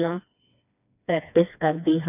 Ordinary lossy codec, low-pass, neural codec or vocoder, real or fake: none; 3.6 kHz; codec, 16 kHz, 2 kbps, FreqCodec, smaller model; fake